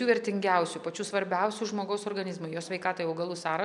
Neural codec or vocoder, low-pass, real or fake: none; 10.8 kHz; real